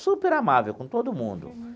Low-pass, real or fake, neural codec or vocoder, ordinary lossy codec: none; real; none; none